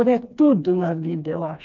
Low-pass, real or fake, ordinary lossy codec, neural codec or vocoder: 7.2 kHz; fake; none; codec, 16 kHz, 2 kbps, FreqCodec, smaller model